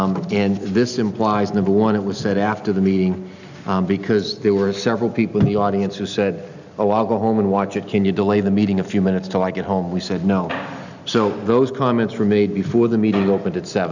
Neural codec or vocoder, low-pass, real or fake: none; 7.2 kHz; real